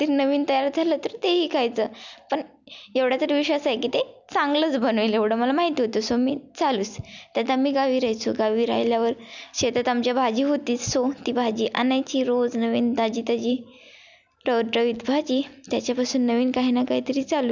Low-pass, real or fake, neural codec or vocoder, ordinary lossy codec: 7.2 kHz; real; none; none